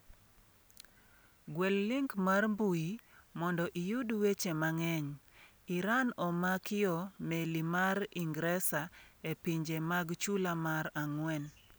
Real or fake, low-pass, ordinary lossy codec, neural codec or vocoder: real; none; none; none